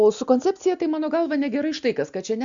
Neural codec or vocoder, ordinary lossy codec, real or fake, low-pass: none; MP3, 64 kbps; real; 7.2 kHz